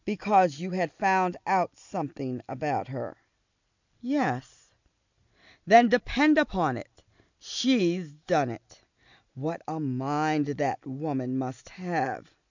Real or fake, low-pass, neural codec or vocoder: real; 7.2 kHz; none